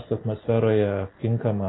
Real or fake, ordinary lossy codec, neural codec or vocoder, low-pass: real; AAC, 16 kbps; none; 7.2 kHz